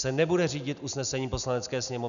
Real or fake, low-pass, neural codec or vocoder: real; 7.2 kHz; none